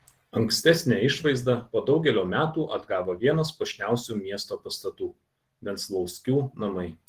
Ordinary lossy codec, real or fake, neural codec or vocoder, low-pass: Opus, 16 kbps; real; none; 14.4 kHz